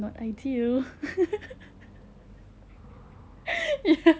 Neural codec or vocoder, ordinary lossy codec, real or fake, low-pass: none; none; real; none